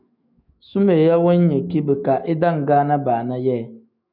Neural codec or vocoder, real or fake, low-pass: autoencoder, 48 kHz, 128 numbers a frame, DAC-VAE, trained on Japanese speech; fake; 5.4 kHz